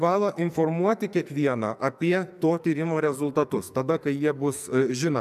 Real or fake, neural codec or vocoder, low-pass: fake; codec, 44.1 kHz, 2.6 kbps, SNAC; 14.4 kHz